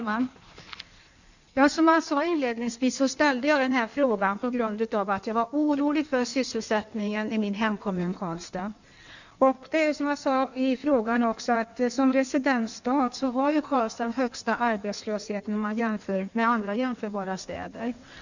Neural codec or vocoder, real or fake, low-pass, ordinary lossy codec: codec, 16 kHz in and 24 kHz out, 1.1 kbps, FireRedTTS-2 codec; fake; 7.2 kHz; none